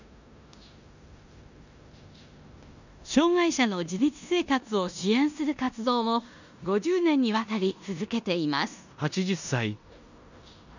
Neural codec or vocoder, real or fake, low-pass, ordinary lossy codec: codec, 16 kHz in and 24 kHz out, 0.9 kbps, LongCat-Audio-Codec, four codebook decoder; fake; 7.2 kHz; none